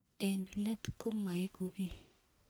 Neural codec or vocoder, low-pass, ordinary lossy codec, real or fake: codec, 44.1 kHz, 1.7 kbps, Pupu-Codec; none; none; fake